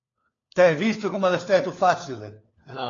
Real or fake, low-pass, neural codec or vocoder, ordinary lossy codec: fake; 7.2 kHz; codec, 16 kHz, 4 kbps, FunCodec, trained on LibriTTS, 50 frames a second; AAC, 32 kbps